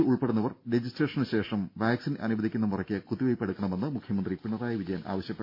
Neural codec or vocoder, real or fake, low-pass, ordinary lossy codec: none; real; 5.4 kHz; MP3, 32 kbps